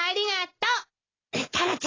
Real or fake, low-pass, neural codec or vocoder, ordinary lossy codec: real; 7.2 kHz; none; AAC, 32 kbps